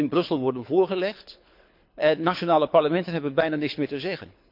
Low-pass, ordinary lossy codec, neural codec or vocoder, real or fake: 5.4 kHz; MP3, 48 kbps; codec, 24 kHz, 6 kbps, HILCodec; fake